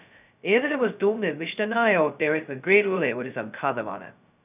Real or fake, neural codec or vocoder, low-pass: fake; codec, 16 kHz, 0.2 kbps, FocalCodec; 3.6 kHz